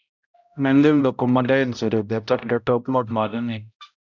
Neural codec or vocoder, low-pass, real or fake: codec, 16 kHz, 0.5 kbps, X-Codec, HuBERT features, trained on balanced general audio; 7.2 kHz; fake